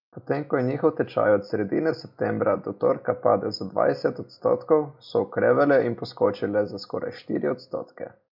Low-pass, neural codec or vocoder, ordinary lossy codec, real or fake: 5.4 kHz; none; MP3, 48 kbps; real